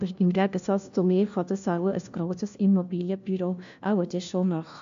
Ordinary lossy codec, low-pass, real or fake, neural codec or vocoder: AAC, 64 kbps; 7.2 kHz; fake; codec, 16 kHz, 1 kbps, FunCodec, trained on LibriTTS, 50 frames a second